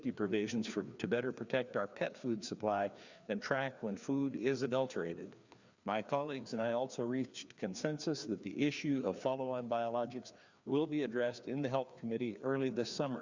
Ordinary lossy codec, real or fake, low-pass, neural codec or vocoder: Opus, 64 kbps; fake; 7.2 kHz; codec, 16 kHz, 2 kbps, FreqCodec, larger model